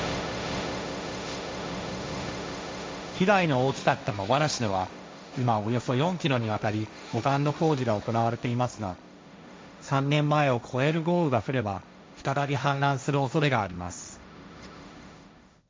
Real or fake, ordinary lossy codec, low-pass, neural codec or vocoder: fake; none; none; codec, 16 kHz, 1.1 kbps, Voila-Tokenizer